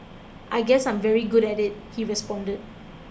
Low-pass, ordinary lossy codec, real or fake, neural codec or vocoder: none; none; real; none